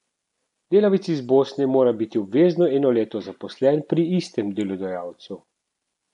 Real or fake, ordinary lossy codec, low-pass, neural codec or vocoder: real; none; 10.8 kHz; none